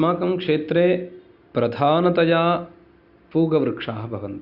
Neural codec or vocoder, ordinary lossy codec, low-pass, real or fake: none; Opus, 64 kbps; 5.4 kHz; real